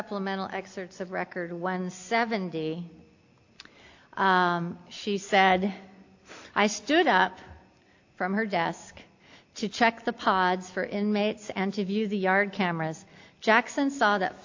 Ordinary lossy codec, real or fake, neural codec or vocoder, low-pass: AAC, 48 kbps; real; none; 7.2 kHz